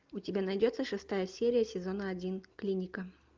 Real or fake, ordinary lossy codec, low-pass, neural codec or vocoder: real; Opus, 24 kbps; 7.2 kHz; none